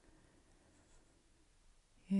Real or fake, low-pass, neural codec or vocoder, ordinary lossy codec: real; 10.8 kHz; none; AAC, 96 kbps